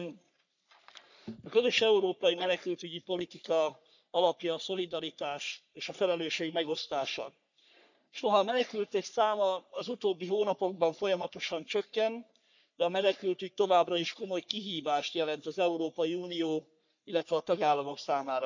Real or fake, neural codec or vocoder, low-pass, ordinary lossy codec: fake; codec, 44.1 kHz, 3.4 kbps, Pupu-Codec; 7.2 kHz; none